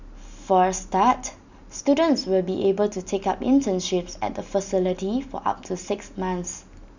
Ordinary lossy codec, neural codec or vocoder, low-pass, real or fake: none; none; 7.2 kHz; real